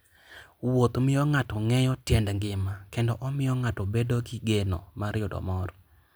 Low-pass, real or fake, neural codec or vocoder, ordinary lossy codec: none; real; none; none